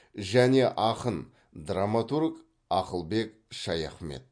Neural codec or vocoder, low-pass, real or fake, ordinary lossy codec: none; 9.9 kHz; real; MP3, 48 kbps